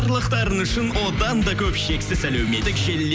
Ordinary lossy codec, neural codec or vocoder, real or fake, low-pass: none; none; real; none